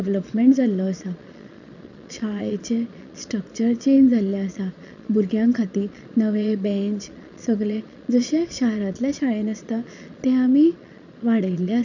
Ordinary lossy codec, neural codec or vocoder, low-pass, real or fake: none; vocoder, 22.05 kHz, 80 mel bands, Vocos; 7.2 kHz; fake